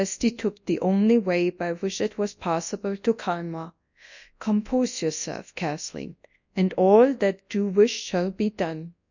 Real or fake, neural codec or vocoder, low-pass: fake; codec, 24 kHz, 0.9 kbps, WavTokenizer, large speech release; 7.2 kHz